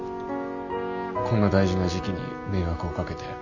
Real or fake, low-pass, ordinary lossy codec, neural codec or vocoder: real; 7.2 kHz; none; none